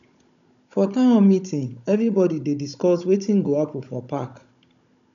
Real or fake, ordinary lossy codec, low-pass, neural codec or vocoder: fake; none; 7.2 kHz; codec, 16 kHz, 16 kbps, FunCodec, trained on Chinese and English, 50 frames a second